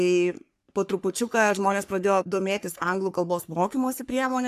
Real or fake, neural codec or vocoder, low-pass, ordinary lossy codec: fake; codec, 44.1 kHz, 3.4 kbps, Pupu-Codec; 14.4 kHz; AAC, 96 kbps